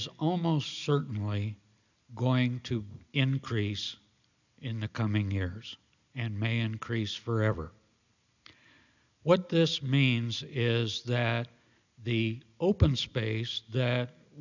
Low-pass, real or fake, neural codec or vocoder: 7.2 kHz; real; none